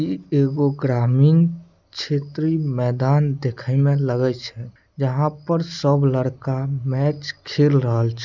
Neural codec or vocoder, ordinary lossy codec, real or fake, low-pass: none; none; real; 7.2 kHz